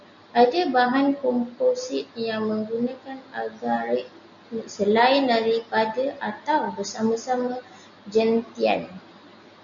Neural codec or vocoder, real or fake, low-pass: none; real; 7.2 kHz